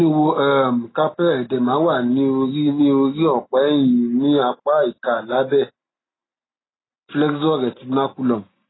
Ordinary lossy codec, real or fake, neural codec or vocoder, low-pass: AAC, 16 kbps; real; none; 7.2 kHz